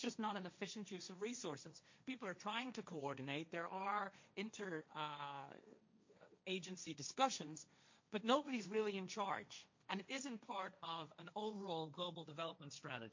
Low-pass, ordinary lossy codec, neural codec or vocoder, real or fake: 7.2 kHz; MP3, 48 kbps; codec, 16 kHz, 1.1 kbps, Voila-Tokenizer; fake